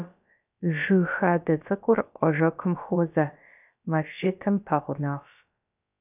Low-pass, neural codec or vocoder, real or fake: 3.6 kHz; codec, 16 kHz, about 1 kbps, DyCAST, with the encoder's durations; fake